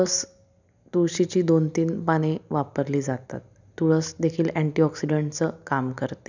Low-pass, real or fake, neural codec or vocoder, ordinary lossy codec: 7.2 kHz; real; none; none